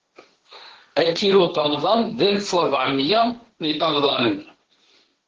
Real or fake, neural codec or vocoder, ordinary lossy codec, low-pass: fake; codec, 16 kHz, 1.1 kbps, Voila-Tokenizer; Opus, 16 kbps; 7.2 kHz